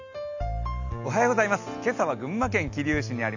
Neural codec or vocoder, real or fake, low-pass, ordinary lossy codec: none; real; 7.2 kHz; none